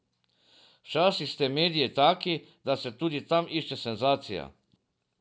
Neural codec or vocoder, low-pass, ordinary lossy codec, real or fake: none; none; none; real